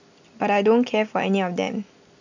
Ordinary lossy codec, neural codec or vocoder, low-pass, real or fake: none; none; 7.2 kHz; real